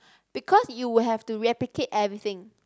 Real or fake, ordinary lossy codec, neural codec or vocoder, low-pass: real; none; none; none